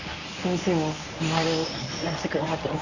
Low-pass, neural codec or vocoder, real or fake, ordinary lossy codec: 7.2 kHz; codec, 24 kHz, 0.9 kbps, WavTokenizer, medium speech release version 1; fake; none